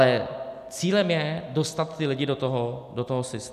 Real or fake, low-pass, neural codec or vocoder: real; 14.4 kHz; none